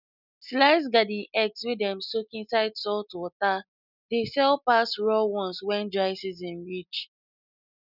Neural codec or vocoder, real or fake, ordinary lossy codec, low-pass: none; real; none; 5.4 kHz